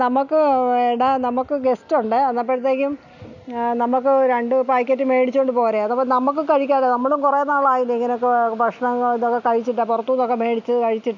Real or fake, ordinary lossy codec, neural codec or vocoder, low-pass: real; none; none; 7.2 kHz